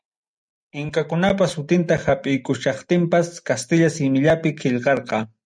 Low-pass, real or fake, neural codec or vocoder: 9.9 kHz; real; none